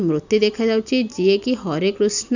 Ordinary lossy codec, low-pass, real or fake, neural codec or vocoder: none; 7.2 kHz; real; none